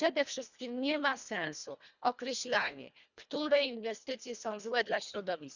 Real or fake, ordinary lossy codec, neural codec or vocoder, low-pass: fake; none; codec, 24 kHz, 1.5 kbps, HILCodec; 7.2 kHz